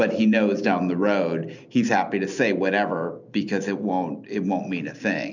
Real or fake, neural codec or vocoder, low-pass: real; none; 7.2 kHz